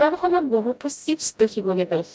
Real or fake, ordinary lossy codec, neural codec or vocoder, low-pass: fake; none; codec, 16 kHz, 0.5 kbps, FreqCodec, smaller model; none